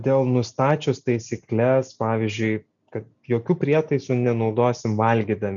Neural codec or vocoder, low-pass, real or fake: none; 7.2 kHz; real